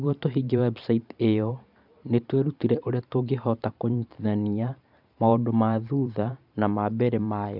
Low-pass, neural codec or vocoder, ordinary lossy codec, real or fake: 5.4 kHz; vocoder, 44.1 kHz, 128 mel bands every 512 samples, BigVGAN v2; none; fake